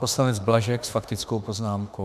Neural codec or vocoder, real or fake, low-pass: autoencoder, 48 kHz, 32 numbers a frame, DAC-VAE, trained on Japanese speech; fake; 14.4 kHz